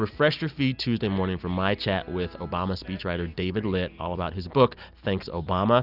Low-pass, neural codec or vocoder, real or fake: 5.4 kHz; none; real